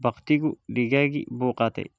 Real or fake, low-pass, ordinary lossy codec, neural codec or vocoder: real; none; none; none